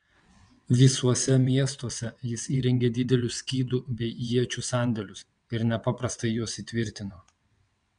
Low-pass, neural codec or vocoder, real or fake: 9.9 kHz; vocoder, 22.05 kHz, 80 mel bands, WaveNeXt; fake